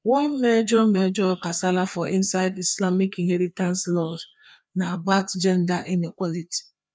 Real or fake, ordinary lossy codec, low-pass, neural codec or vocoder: fake; none; none; codec, 16 kHz, 2 kbps, FreqCodec, larger model